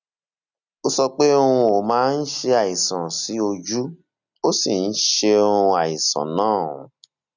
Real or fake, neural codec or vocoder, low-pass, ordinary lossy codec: real; none; 7.2 kHz; none